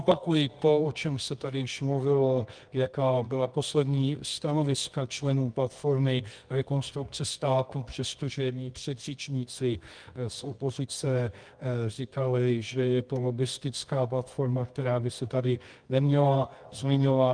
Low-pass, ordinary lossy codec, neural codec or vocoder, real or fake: 9.9 kHz; Opus, 32 kbps; codec, 24 kHz, 0.9 kbps, WavTokenizer, medium music audio release; fake